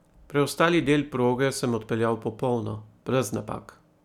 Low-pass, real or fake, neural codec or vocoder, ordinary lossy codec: 19.8 kHz; real; none; none